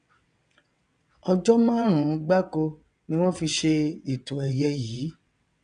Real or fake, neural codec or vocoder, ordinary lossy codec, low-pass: fake; vocoder, 22.05 kHz, 80 mel bands, WaveNeXt; none; 9.9 kHz